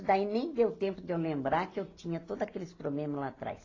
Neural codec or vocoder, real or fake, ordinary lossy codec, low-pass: none; real; AAC, 32 kbps; 7.2 kHz